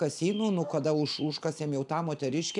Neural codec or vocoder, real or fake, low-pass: none; real; 10.8 kHz